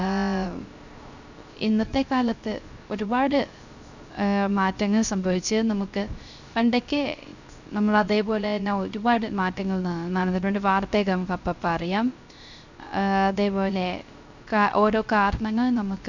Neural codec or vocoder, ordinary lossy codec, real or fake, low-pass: codec, 16 kHz, 0.3 kbps, FocalCodec; none; fake; 7.2 kHz